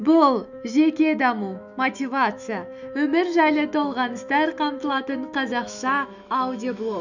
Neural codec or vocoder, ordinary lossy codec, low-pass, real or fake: autoencoder, 48 kHz, 128 numbers a frame, DAC-VAE, trained on Japanese speech; none; 7.2 kHz; fake